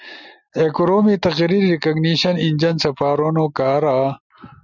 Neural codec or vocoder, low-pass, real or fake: none; 7.2 kHz; real